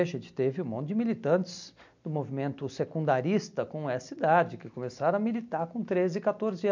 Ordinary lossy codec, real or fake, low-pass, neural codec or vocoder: MP3, 64 kbps; real; 7.2 kHz; none